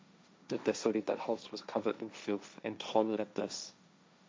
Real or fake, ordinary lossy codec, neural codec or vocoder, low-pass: fake; none; codec, 16 kHz, 1.1 kbps, Voila-Tokenizer; none